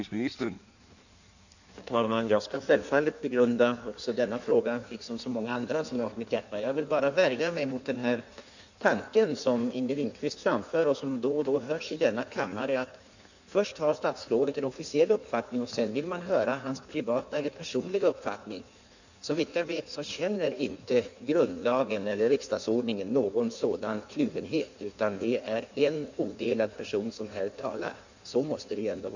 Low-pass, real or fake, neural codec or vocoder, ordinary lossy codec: 7.2 kHz; fake; codec, 16 kHz in and 24 kHz out, 1.1 kbps, FireRedTTS-2 codec; none